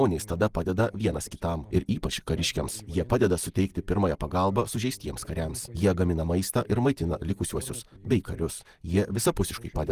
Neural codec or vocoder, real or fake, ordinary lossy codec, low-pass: none; real; Opus, 24 kbps; 14.4 kHz